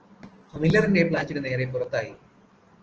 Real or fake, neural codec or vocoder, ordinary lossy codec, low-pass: real; none; Opus, 16 kbps; 7.2 kHz